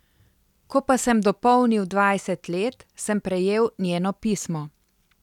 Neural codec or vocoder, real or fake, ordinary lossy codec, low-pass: none; real; none; 19.8 kHz